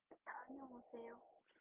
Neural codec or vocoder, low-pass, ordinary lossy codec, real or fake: none; 3.6 kHz; Opus, 32 kbps; real